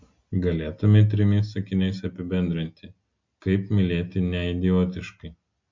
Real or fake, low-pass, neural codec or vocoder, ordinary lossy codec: real; 7.2 kHz; none; MP3, 48 kbps